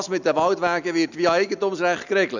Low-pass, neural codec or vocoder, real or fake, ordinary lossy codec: 7.2 kHz; none; real; none